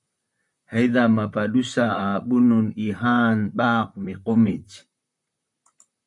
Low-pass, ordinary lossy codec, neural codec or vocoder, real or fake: 10.8 kHz; MP3, 96 kbps; vocoder, 44.1 kHz, 128 mel bands, Pupu-Vocoder; fake